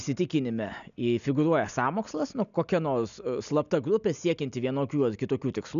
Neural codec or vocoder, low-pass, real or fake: none; 7.2 kHz; real